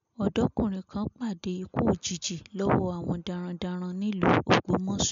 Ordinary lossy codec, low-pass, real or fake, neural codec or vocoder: MP3, 64 kbps; 7.2 kHz; real; none